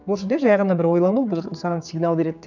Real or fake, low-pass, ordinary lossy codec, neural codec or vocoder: fake; 7.2 kHz; none; codec, 16 kHz, 4 kbps, X-Codec, HuBERT features, trained on general audio